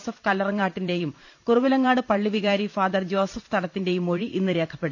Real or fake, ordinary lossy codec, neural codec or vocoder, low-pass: fake; none; vocoder, 44.1 kHz, 128 mel bands every 512 samples, BigVGAN v2; 7.2 kHz